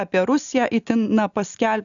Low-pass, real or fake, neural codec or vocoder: 7.2 kHz; real; none